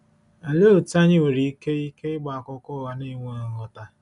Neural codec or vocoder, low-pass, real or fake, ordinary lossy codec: none; 10.8 kHz; real; none